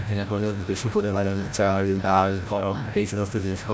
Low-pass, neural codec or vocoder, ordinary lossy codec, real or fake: none; codec, 16 kHz, 0.5 kbps, FreqCodec, larger model; none; fake